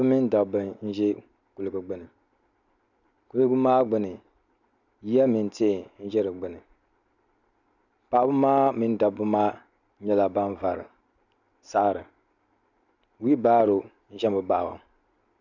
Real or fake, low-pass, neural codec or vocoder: real; 7.2 kHz; none